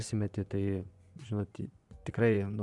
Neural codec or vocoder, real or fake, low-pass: vocoder, 24 kHz, 100 mel bands, Vocos; fake; 10.8 kHz